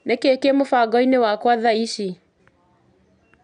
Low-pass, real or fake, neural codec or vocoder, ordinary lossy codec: 9.9 kHz; real; none; none